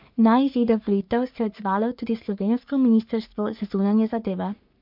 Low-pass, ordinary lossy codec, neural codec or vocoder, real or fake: 5.4 kHz; MP3, 48 kbps; codec, 44.1 kHz, 3.4 kbps, Pupu-Codec; fake